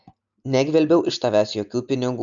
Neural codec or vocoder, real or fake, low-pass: none; real; 7.2 kHz